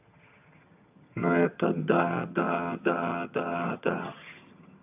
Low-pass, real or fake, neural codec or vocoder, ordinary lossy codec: 3.6 kHz; fake; vocoder, 22.05 kHz, 80 mel bands, HiFi-GAN; AAC, 32 kbps